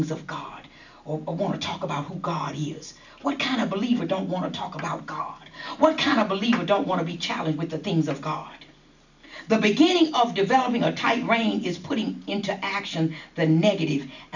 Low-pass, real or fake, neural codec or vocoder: 7.2 kHz; real; none